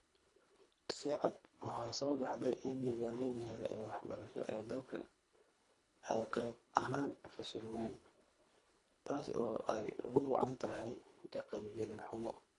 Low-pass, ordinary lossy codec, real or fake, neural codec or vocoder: 10.8 kHz; AAC, 64 kbps; fake; codec, 24 kHz, 1.5 kbps, HILCodec